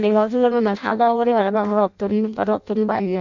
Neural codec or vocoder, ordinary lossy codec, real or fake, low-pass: codec, 16 kHz in and 24 kHz out, 0.6 kbps, FireRedTTS-2 codec; none; fake; 7.2 kHz